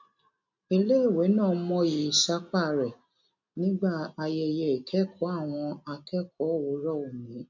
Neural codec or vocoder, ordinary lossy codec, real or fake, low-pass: none; none; real; 7.2 kHz